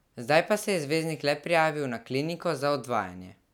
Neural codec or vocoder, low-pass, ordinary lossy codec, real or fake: none; 19.8 kHz; none; real